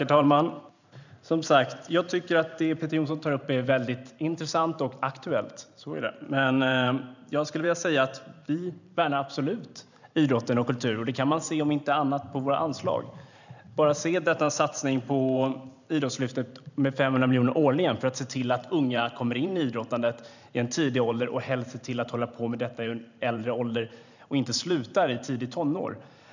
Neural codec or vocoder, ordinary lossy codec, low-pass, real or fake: vocoder, 44.1 kHz, 128 mel bands every 512 samples, BigVGAN v2; none; 7.2 kHz; fake